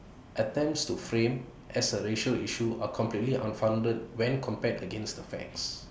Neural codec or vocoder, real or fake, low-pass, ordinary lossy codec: none; real; none; none